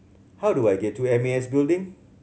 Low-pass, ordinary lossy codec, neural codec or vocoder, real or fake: none; none; none; real